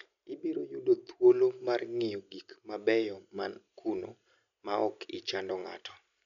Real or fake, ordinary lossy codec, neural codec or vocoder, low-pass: real; none; none; 7.2 kHz